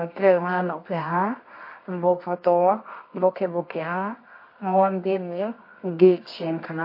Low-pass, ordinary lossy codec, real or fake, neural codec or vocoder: 5.4 kHz; MP3, 32 kbps; fake; codec, 24 kHz, 0.9 kbps, WavTokenizer, medium music audio release